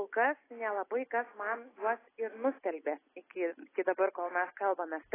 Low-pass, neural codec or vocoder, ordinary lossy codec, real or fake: 3.6 kHz; none; AAC, 16 kbps; real